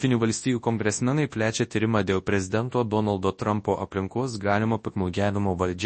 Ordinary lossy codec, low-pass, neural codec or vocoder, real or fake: MP3, 32 kbps; 10.8 kHz; codec, 24 kHz, 0.9 kbps, WavTokenizer, large speech release; fake